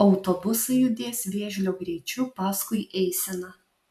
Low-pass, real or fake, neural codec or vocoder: 14.4 kHz; real; none